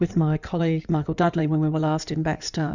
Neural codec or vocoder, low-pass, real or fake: codec, 16 kHz in and 24 kHz out, 2.2 kbps, FireRedTTS-2 codec; 7.2 kHz; fake